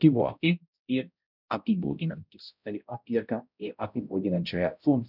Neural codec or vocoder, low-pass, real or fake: codec, 16 kHz, 0.5 kbps, X-Codec, HuBERT features, trained on balanced general audio; 5.4 kHz; fake